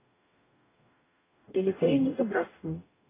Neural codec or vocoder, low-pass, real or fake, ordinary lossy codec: codec, 44.1 kHz, 0.9 kbps, DAC; 3.6 kHz; fake; AAC, 16 kbps